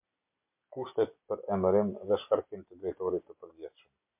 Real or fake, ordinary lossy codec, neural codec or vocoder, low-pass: real; MP3, 32 kbps; none; 3.6 kHz